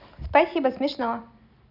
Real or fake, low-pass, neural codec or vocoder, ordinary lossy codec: real; 5.4 kHz; none; none